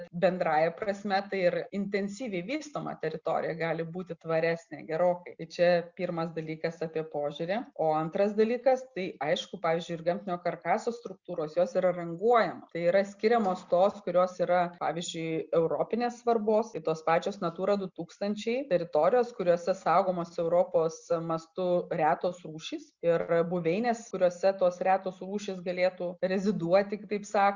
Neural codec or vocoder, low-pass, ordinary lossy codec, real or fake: none; 7.2 kHz; Opus, 64 kbps; real